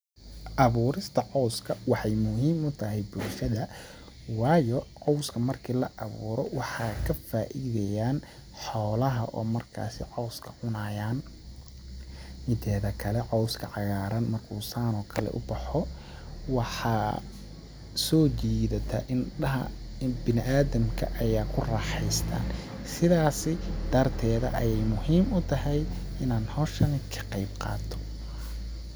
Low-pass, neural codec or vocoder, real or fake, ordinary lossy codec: none; none; real; none